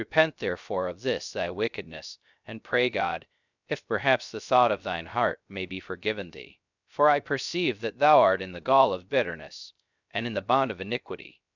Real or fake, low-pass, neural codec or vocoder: fake; 7.2 kHz; codec, 16 kHz, 0.3 kbps, FocalCodec